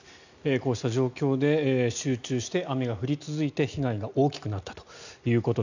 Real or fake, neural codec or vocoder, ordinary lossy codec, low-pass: real; none; none; 7.2 kHz